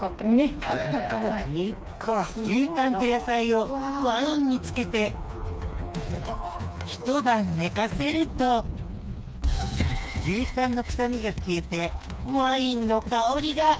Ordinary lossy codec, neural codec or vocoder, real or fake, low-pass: none; codec, 16 kHz, 2 kbps, FreqCodec, smaller model; fake; none